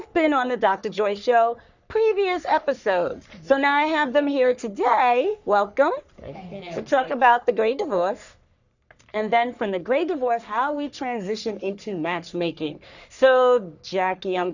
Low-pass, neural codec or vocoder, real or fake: 7.2 kHz; codec, 44.1 kHz, 3.4 kbps, Pupu-Codec; fake